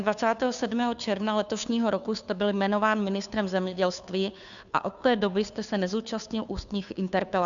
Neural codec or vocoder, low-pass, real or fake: codec, 16 kHz, 2 kbps, FunCodec, trained on Chinese and English, 25 frames a second; 7.2 kHz; fake